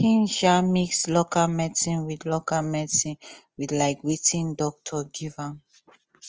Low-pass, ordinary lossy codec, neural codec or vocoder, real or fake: 7.2 kHz; Opus, 24 kbps; none; real